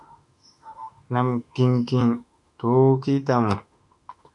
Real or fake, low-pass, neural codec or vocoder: fake; 10.8 kHz; autoencoder, 48 kHz, 32 numbers a frame, DAC-VAE, trained on Japanese speech